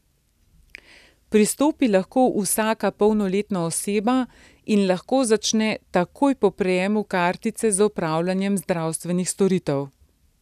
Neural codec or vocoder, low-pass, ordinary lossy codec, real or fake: none; 14.4 kHz; none; real